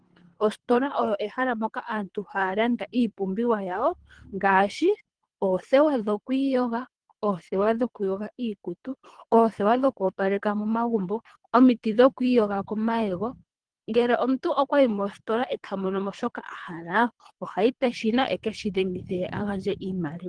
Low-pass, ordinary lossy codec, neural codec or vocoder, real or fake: 9.9 kHz; Opus, 24 kbps; codec, 24 kHz, 3 kbps, HILCodec; fake